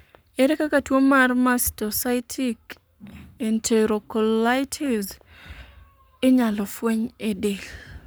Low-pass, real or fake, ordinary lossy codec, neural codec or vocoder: none; fake; none; codec, 44.1 kHz, 7.8 kbps, Pupu-Codec